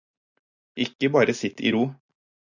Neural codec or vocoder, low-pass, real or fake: none; 7.2 kHz; real